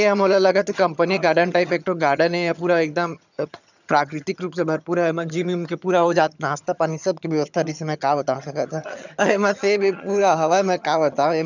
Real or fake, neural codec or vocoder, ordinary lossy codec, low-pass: fake; vocoder, 22.05 kHz, 80 mel bands, HiFi-GAN; none; 7.2 kHz